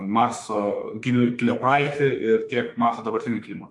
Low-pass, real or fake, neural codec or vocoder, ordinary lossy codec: 10.8 kHz; fake; autoencoder, 48 kHz, 32 numbers a frame, DAC-VAE, trained on Japanese speech; AAC, 64 kbps